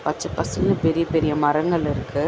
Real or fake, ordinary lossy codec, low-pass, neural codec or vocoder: real; none; none; none